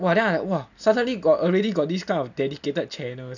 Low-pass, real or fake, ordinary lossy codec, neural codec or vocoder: 7.2 kHz; real; none; none